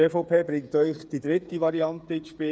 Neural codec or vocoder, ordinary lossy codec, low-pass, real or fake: codec, 16 kHz, 8 kbps, FreqCodec, smaller model; none; none; fake